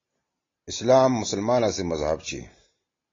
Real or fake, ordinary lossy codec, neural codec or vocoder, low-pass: real; AAC, 32 kbps; none; 7.2 kHz